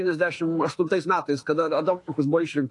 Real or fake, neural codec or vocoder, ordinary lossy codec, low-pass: fake; autoencoder, 48 kHz, 32 numbers a frame, DAC-VAE, trained on Japanese speech; AAC, 48 kbps; 10.8 kHz